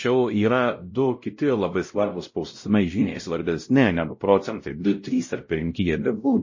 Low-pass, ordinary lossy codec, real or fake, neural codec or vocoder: 7.2 kHz; MP3, 32 kbps; fake; codec, 16 kHz, 0.5 kbps, X-Codec, WavLM features, trained on Multilingual LibriSpeech